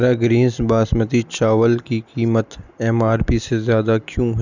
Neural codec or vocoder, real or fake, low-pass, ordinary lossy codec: none; real; 7.2 kHz; none